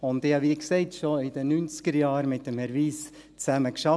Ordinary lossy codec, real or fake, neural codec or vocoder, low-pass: none; real; none; none